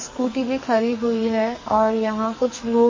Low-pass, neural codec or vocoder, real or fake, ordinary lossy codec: 7.2 kHz; codec, 44.1 kHz, 2.6 kbps, SNAC; fake; MP3, 32 kbps